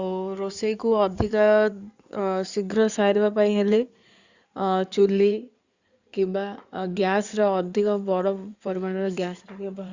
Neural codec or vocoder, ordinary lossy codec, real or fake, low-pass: codec, 16 kHz in and 24 kHz out, 2.2 kbps, FireRedTTS-2 codec; Opus, 64 kbps; fake; 7.2 kHz